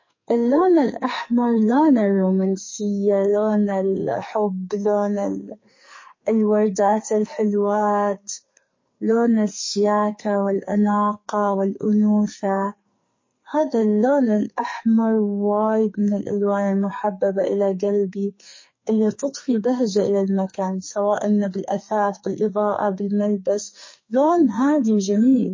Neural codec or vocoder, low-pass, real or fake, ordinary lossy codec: codec, 44.1 kHz, 2.6 kbps, SNAC; 7.2 kHz; fake; MP3, 32 kbps